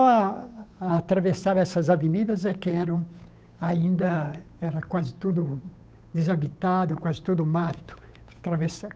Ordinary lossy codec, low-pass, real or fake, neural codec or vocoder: none; none; fake; codec, 16 kHz, 8 kbps, FunCodec, trained on Chinese and English, 25 frames a second